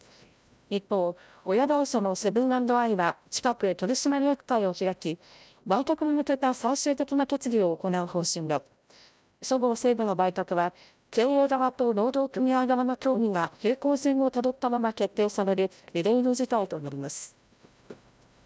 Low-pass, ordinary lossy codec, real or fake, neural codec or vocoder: none; none; fake; codec, 16 kHz, 0.5 kbps, FreqCodec, larger model